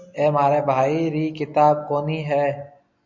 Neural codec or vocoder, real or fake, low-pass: none; real; 7.2 kHz